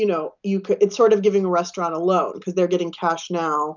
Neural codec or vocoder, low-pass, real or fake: none; 7.2 kHz; real